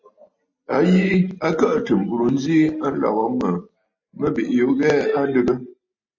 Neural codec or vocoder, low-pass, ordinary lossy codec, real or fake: none; 7.2 kHz; MP3, 32 kbps; real